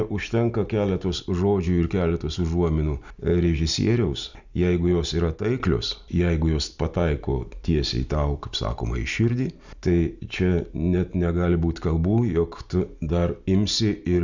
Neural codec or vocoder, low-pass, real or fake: none; 7.2 kHz; real